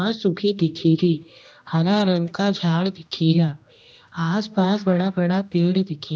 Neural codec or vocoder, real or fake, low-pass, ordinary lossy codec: codec, 16 kHz, 1 kbps, X-Codec, HuBERT features, trained on general audio; fake; none; none